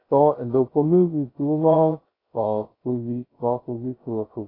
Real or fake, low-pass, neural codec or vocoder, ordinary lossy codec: fake; 5.4 kHz; codec, 16 kHz, 0.2 kbps, FocalCodec; AAC, 24 kbps